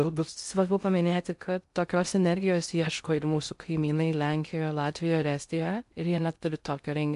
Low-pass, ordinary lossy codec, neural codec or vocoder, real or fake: 10.8 kHz; MP3, 64 kbps; codec, 16 kHz in and 24 kHz out, 0.6 kbps, FocalCodec, streaming, 2048 codes; fake